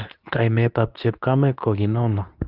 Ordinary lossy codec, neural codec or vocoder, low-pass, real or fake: Opus, 24 kbps; codec, 24 kHz, 0.9 kbps, WavTokenizer, medium speech release version 2; 5.4 kHz; fake